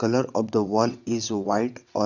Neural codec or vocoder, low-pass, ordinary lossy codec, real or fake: vocoder, 22.05 kHz, 80 mel bands, WaveNeXt; 7.2 kHz; none; fake